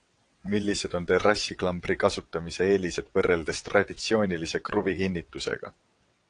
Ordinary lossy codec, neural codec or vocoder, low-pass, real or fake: AAC, 48 kbps; vocoder, 22.05 kHz, 80 mel bands, WaveNeXt; 9.9 kHz; fake